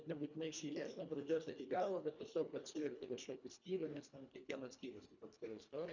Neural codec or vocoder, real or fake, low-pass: codec, 24 kHz, 1.5 kbps, HILCodec; fake; 7.2 kHz